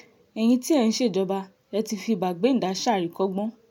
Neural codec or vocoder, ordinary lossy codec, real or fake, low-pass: none; MP3, 96 kbps; real; 19.8 kHz